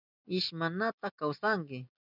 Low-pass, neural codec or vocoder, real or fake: 5.4 kHz; none; real